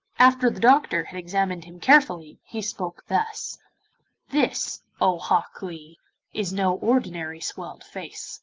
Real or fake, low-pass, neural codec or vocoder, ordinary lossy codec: real; 7.2 kHz; none; Opus, 24 kbps